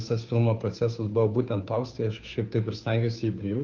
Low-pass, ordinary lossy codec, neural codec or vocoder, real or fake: 7.2 kHz; Opus, 24 kbps; codec, 16 kHz, 2 kbps, FunCodec, trained on Chinese and English, 25 frames a second; fake